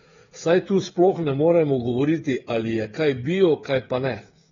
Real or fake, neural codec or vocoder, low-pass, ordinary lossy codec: fake; codec, 16 kHz, 8 kbps, FreqCodec, smaller model; 7.2 kHz; AAC, 24 kbps